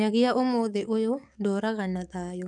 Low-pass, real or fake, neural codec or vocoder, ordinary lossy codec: 10.8 kHz; fake; codec, 44.1 kHz, 7.8 kbps, DAC; none